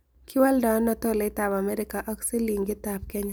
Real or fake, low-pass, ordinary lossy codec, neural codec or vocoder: real; none; none; none